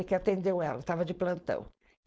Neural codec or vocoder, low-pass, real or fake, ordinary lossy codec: codec, 16 kHz, 4.8 kbps, FACodec; none; fake; none